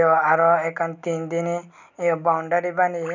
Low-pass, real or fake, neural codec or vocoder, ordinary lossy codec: 7.2 kHz; real; none; none